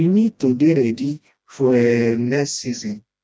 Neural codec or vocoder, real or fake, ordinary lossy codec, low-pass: codec, 16 kHz, 1 kbps, FreqCodec, smaller model; fake; none; none